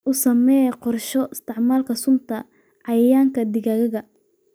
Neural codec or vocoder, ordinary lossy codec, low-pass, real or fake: none; none; none; real